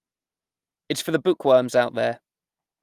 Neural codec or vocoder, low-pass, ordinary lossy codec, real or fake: none; 14.4 kHz; Opus, 32 kbps; real